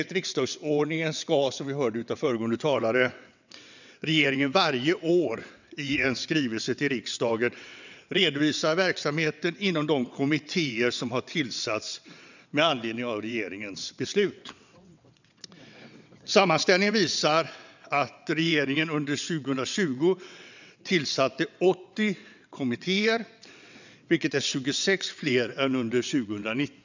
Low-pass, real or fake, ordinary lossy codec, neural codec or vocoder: 7.2 kHz; fake; none; vocoder, 22.05 kHz, 80 mel bands, WaveNeXt